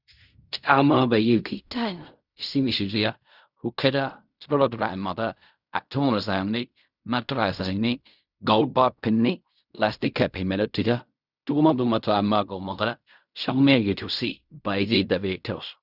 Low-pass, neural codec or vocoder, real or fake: 5.4 kHz; codec, 16 kHz in and 24 kHz out, 0.4 kbps, LongCat-Audio-Codec, fine tuned four codebook decoder; fake